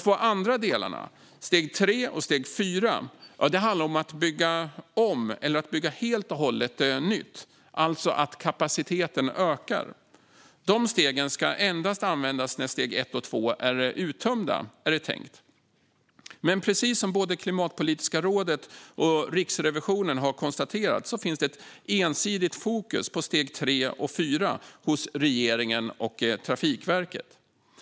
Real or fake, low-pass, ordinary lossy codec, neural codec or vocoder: real; none; none; none